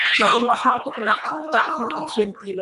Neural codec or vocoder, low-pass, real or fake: codec, 24 kHz, 1.5 kbps, HILCodec; 10.8 kHz; fake